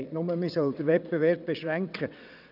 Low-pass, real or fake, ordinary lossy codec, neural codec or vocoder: 5.4 kHz; fake; none; vocoder, 22.05 kHz, 80 mel bands, WaveNeXt